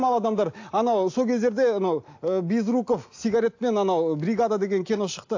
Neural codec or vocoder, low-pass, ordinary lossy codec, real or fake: none; 7.2 kHz; AAC, 48 kbps; real